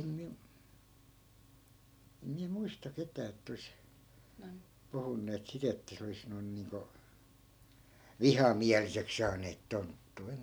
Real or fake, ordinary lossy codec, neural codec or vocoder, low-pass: real; none; none; none